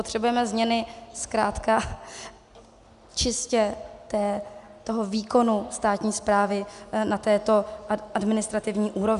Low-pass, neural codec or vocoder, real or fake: 10.8 kHz; none; real